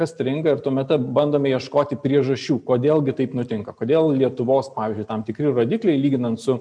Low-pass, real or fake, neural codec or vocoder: 9.9 kHz; real; none